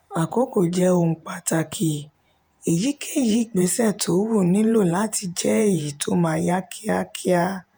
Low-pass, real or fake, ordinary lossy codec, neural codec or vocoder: none; fake; none; vocoder, 48 kHz, 128 mel bands, Vocos